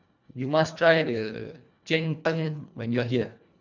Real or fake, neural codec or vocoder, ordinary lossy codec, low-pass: fake; codec, 24 kHz, 1.5 kbps, HILCodec; none; 7.2 kHz